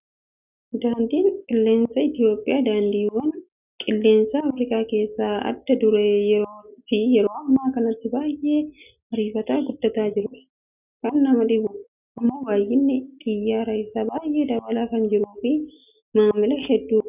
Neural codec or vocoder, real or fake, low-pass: none; real; 3.6 kHz